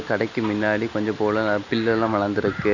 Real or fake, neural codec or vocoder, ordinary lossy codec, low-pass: real; none; none; 7.2 kHz